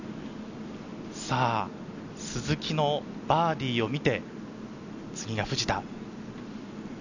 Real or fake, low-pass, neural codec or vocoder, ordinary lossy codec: real; 7.2 kHz; none; none